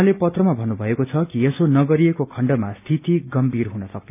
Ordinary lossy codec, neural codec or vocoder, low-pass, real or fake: none; none; 3.6 kHz; real